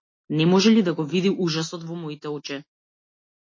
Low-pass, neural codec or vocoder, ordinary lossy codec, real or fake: 7.2 kHz; none; MP3, 32 kbps; real